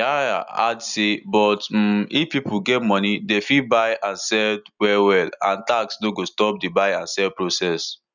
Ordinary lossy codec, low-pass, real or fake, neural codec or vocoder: none; 7.2 kHz; real; none